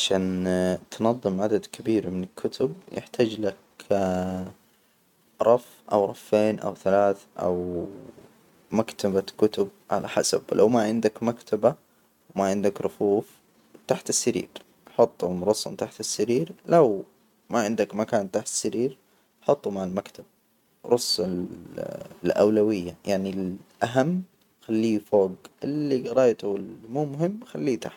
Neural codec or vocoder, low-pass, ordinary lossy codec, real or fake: none; 19.8 kHz; none; real